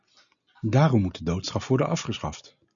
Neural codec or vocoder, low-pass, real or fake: none; 7.2 kHz; real